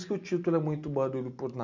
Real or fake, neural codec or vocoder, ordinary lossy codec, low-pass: real; none; none; 7.2 kHz